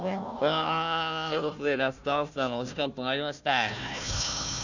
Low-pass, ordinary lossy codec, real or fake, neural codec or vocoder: 7.2 kHz; none; fake; codec, 16 kHz, 1 kbps, FunCodec, trained on Chinese and English, 50 frames a second